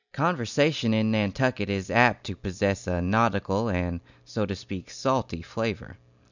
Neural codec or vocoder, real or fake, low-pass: none; real; 7.2 kHz